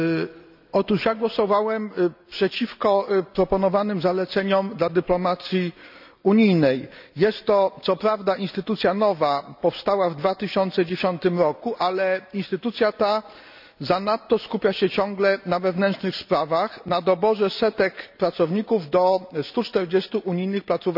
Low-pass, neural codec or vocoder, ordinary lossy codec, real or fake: 5.4 kHz; none; none; real